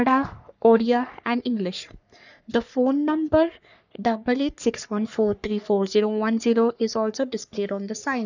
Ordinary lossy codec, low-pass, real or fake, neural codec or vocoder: none; 7.2 kHz; fake; codec, 44.1 kHz, 3.4 kbps, Pupu-Codec